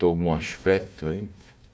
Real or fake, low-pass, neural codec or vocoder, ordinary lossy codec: fake; none; codec, 16 kHz, 1 kbps, FunCodec, trained on Chinese and English, 50 frames a second; none